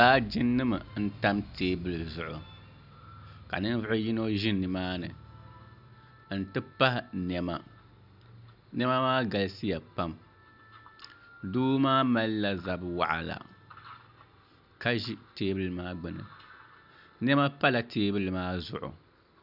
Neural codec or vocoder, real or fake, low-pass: none; real; 5.4 kHz